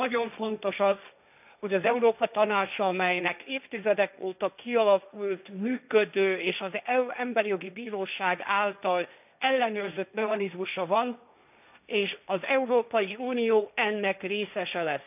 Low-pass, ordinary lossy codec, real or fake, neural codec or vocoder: 3.6 kHz; none; fake; codec, 16 kHz, 1.1 kbps, Voila-Tokenizer